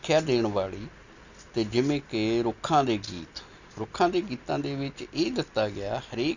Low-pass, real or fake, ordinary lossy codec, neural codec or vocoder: 7.2 kHz; real; none; none